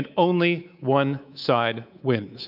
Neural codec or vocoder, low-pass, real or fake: codec, 24 kHz, 3.1 kbps, DualCodec; 5.4 kHz; fake